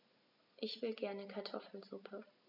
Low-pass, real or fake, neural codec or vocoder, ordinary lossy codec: 5.4 kHz; real; none; none